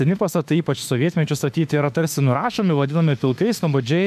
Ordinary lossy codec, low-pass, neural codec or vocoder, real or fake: MP3, 96 kbps; 14.4 kHz; autoencoder, 48 kHz, 32 numbers a frame, DAC-VAE, trained on Japanese speech; fake